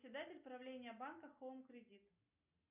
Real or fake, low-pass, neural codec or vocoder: real; 3.6 kHz; none